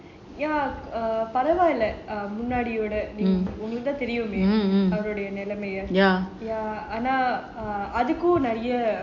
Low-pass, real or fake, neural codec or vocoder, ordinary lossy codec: 7.2 kHz; real; none; MP3, 48 kbps